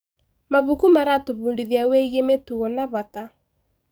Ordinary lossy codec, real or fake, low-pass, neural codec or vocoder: none; fake; none; codec, 44.1 kHz, 7.8 kbps, DAC